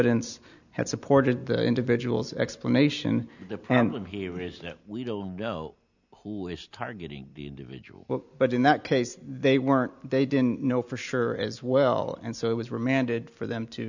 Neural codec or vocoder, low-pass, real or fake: none; 7.2 kHz; real